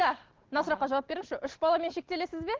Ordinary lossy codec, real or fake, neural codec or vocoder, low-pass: Opus, 16 kbps; real; none; 7.2 kHz